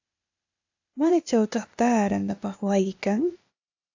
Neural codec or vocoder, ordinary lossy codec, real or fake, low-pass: codec, 16 kHz, 0.8 kbps, ZipCodec; AAC, 48 kbps; fake; 7.2 kHz